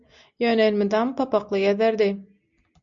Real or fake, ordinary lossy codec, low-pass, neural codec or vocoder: real; MP3, 64 kbps; 7.2 kHz; none